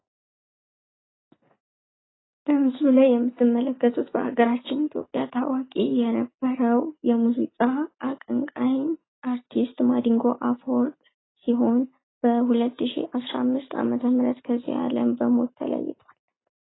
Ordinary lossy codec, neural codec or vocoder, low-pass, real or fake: AAC, 16 kbps; none; 7.2 kHz; real